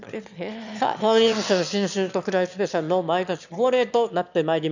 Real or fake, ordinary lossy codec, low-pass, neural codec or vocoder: fake; none; 7.2 kHz; autoencoder, 22.05 kHz, a latent of 192 numbers a frame, VITS, trained on one speaker